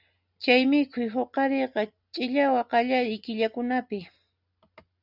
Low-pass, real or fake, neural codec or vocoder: 5.4 kHz; real; none